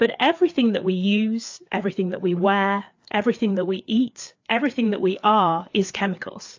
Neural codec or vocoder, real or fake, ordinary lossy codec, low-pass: codec, 16 kHz, 4 kbps, FreqCodec, larger model; fake; AAC, 48 kbps; 7.2 kHz